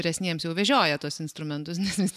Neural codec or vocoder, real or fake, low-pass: none; real; 14.4 kHz